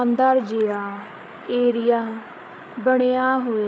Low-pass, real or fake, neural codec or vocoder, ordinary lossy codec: none; fake; codec, 16 kHz, 8 kbps, FreqCodec, larger model; none